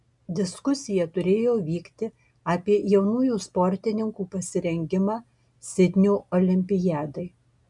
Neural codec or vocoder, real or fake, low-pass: none; real; 10.8 kHz